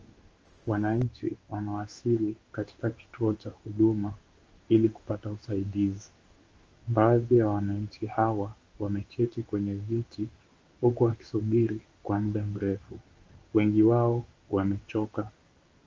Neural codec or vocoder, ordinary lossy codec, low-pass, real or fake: codec, 16 kHz in and 24 kHz out, 1 kbps, XY-Tokenizer; Opus, 24 kbps; 7.2 kHz; fake